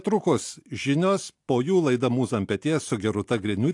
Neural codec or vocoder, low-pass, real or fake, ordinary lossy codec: none; 10.8 kHz; real; AAC, 64 kbps